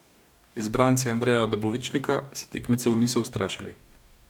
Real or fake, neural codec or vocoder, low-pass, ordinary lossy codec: fake; codec, 44.1 kHz, 2.6 kbps, DAC; 19.8 kHz; none